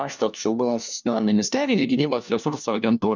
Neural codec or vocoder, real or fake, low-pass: codec, 16 kHz, 1 kbps, FunCodec, trained on LibriTTS, 50 frames a second; fake; 7.2 kHz